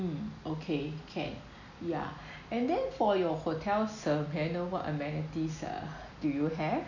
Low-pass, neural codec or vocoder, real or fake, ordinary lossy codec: 7.2 kHz; none; real; none